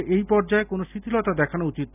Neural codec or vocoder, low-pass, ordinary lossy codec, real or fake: none; 3.6 kHz; none; real